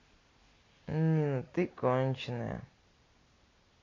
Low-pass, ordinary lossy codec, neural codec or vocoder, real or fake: 7.2 kHz; AAC, 32 kbps; none; real